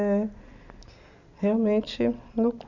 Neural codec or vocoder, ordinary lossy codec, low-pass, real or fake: none; none; 7.2 kHz; real